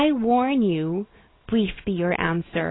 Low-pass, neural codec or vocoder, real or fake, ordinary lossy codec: 7.2 kHz; none; real; AAC, 16 kbps